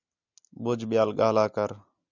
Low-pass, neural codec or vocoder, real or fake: 7.2 kHz; none; real